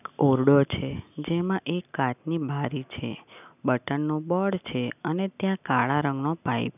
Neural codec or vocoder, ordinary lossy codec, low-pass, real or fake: none; none; 3.6 kHz; real